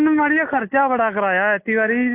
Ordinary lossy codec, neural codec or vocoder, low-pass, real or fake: AAC, 16 kbps; none; 3.6 kHz; real